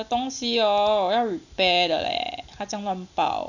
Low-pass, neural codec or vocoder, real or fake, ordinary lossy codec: 7.2 kHz; none; real; none